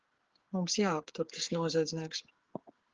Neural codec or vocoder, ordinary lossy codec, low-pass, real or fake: codec, 16 kHz, 8 kbps, FreqCodec, smaller model; Opus, 16 kbps; 7.2 kHz; fake